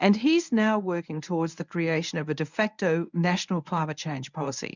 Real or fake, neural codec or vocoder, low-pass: fake; codec, 24 kHz, 0.9 kbps, WavTokenizer, medium speech release version 1; 7.2 kHz